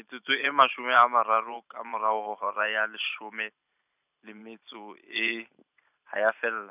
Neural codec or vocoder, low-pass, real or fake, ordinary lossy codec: vocoder, 44.1 kHz, 128 mel bands every 512 samples, BigVGAN v2; 3.6 kHz; fake; none